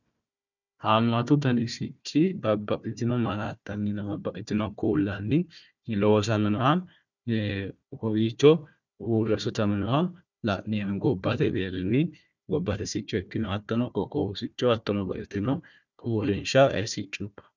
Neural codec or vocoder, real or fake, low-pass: codec, 16 kHz, 1 kbps, FunCodec, trained on Chinese and English, 50 frames a second; fake; 7.2 kHz